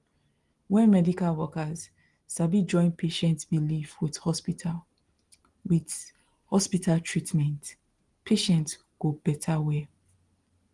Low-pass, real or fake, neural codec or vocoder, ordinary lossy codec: 10.8 kHz; real; none; Opus, 24 kbps